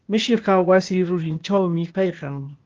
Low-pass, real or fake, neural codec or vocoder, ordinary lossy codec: 7.2 kHz; fake; codec, 16 kHz, 0.8 kbps, ZipCodec; Opus, 32 kbps